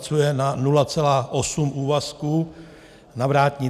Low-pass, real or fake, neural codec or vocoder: 14.4 kHz; real; none